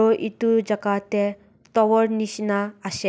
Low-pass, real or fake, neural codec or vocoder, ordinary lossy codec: none; real; none; none